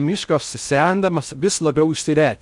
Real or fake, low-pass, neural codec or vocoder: fake; 10.8 kHz; codec, 16 kHz in and 24 kHz out, 0.6 kbps, FocalCodec, streaming, 4096 codes